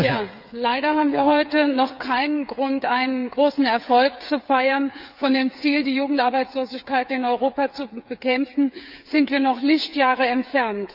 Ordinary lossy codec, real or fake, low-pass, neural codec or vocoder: none; fake; 5.4 kHz; codec, 16 kHz, 8 kbps, FreqCodec, smaller model